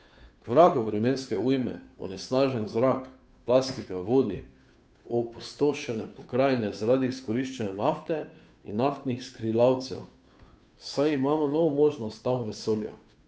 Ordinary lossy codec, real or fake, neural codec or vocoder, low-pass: none; fake; codec, 16 kHz, 2 kbps, FunCodec, trained on Chinese and English, 25 frames a second; none